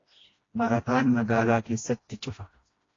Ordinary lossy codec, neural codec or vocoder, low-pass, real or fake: AAC, 32 kbps; codec, 16 kHz, 1 kbps, FreqCodec, smaller model; 7.2 kHz; fake